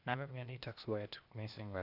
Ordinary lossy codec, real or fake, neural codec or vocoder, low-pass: none; fake; codec, 16 kHz, 0.8 kbps, ZipCodec; 5.4 kHz